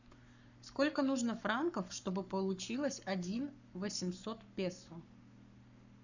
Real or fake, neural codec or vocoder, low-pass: fake; codec, 44.1 kHz, 7.8 kbps, Pupu-Codec; 7.2 kHz